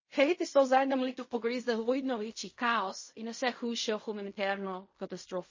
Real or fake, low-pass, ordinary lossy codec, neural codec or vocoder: fake; 7.2 kHz; MP3, 32 kbps; codec, 16 kHz in and 24 kHz out, 0.4 kbps, LongCat-Audio-Codec, fine tuned four codebook decoder